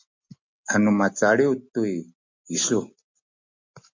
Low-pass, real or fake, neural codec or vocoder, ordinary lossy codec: 7.2 kHz; real; none; MP3, 48 kbps